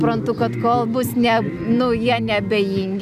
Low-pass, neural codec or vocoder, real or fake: 14.4 kHz; none; real